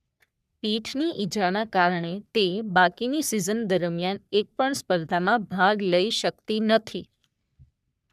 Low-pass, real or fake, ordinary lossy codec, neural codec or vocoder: 14.4 kHz; fake; none; codec, 44.1 kHz, 3.4 kbps, Pupu-Codec